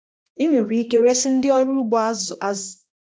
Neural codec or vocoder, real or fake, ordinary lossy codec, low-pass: codec, 16 kHz, 1 kbps, X-Codec, HuBERT features, trained on balanced general audio; fake; none; none